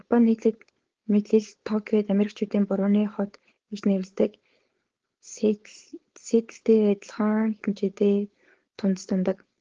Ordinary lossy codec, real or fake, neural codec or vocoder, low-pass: Opus, 16 kbps; fake; codec, 16 kHz, 4.8 kbps, FACodec; 7.2 kHz